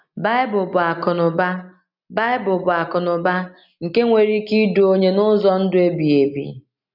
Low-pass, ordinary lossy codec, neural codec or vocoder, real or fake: 5.4 kHz; none; none; real